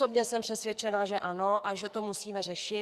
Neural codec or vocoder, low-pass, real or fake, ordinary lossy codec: codec, 44.1 kHz, 2.6 kbps, SNAC; 14.4 kHz; fake; Opus, 64 kbps